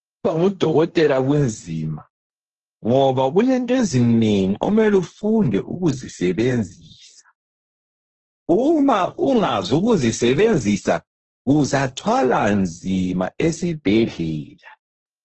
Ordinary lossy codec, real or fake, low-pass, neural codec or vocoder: Opus, 16 kbps; fake; 7.2 kHz; codec, 16 kHz, 1.1 kbps, Voila-Tokenizer